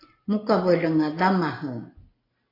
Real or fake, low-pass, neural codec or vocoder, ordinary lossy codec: real; 5.4 kHz; none; AAC, 24 kbps